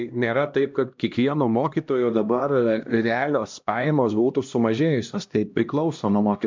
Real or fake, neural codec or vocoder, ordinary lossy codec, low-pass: fake; codec, 16 kHz, 1 kbps, X-Codec, HuBERT features, trained on LibriSpeech; MP3, 64 kbps; 7.2 kHz